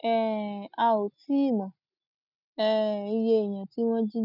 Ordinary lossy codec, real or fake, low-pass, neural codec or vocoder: none; real; 5.4 kHz; none